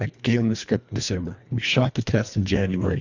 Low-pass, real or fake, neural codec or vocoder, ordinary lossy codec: 7.2 kHz; fake; codec, 24 kHz, 1.5 kbps, HILCodec; Opus, 64 kbps